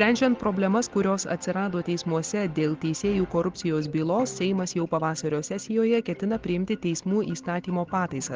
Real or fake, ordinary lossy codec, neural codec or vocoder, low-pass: real; Opus, 16 kbps; none; 7.2 kHz